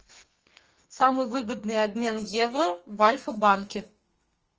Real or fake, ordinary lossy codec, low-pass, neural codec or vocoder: fake; Opus, 24 kbps; 7.2 kHz; codec, 32 kHz, 1.9 kbps, SNAC